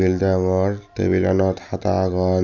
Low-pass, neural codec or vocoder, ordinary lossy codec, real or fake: 7.2 kHz; none; none; real